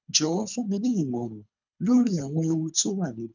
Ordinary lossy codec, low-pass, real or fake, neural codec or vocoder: none; 7.2 kHz; fake; codec, 24 kHz, 3 kbps, HILCodec